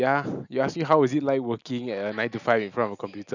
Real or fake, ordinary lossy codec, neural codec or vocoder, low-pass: real; none; none; 7.2 kHz